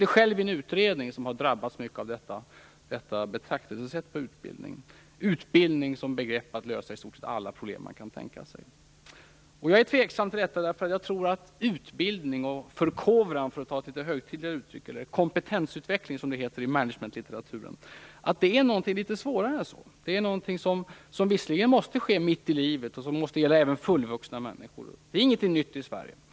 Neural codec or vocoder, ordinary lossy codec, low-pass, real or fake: none; none; none; real